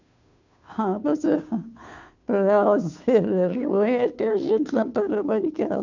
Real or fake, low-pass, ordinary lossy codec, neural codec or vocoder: fake; 7.2 kHz; none; codec, 16 kHz, 2 kbps, FunCodec, trained on Chinese and English, 25 frames a second